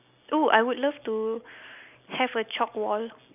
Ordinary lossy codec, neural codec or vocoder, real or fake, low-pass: none; none; real; 3.6 kHz